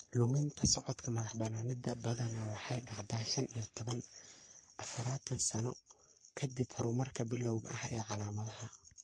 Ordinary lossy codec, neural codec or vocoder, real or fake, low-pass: MP3, 48 kbps; codec, 44.1 kHz, 3.4 kbps, Pupu-Codec; fake; 9.9 kHz